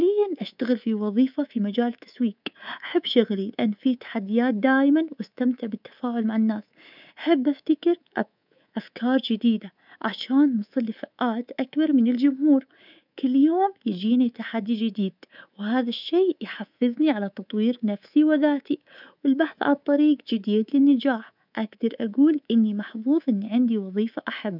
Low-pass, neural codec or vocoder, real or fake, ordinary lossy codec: 5.4 kHz; codec, 24 kHz, 3.1 kbps, DualCodec; fake; none